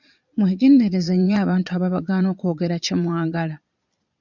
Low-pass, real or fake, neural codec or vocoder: 7.2 kHz; fake; vocoder, 22.05 kHz, 80 mel bands, Vocos